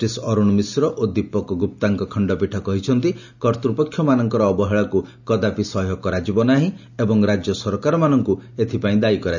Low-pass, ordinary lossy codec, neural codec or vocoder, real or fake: 7.2 kHz; none; none; real